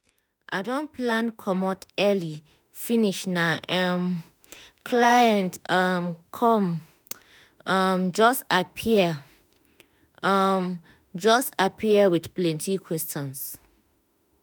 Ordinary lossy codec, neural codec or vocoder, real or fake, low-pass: none; autoencoder, 48 kHz, 32 numbers a frame, DAC-VAE, trained on Japanese speech; fake; none